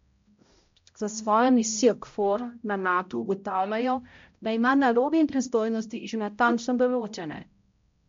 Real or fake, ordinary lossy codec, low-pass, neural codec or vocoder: fake; MP3, 48 kbps; 7.2 kHz; codec, 16 kHz, 0.5 kbps, X-Codec, HuBERT features, trained on balanced general audio